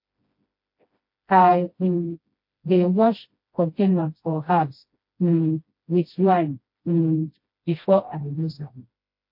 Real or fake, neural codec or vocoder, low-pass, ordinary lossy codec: fake; codec, 16 kHz, 1 kbps, FreqCodec, smaller model; 5.4 kHz; MP3, 32 kbps